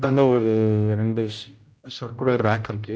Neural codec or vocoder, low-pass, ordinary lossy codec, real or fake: codec, 16 kHz, 0.5 kbps, X-Codec, HuBERT features, trained on general audio; none; none; fake